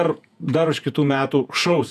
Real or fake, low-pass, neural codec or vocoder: fake; 14.4 kHz; vocoder, 48 kHz, 128 mel bands, Vocos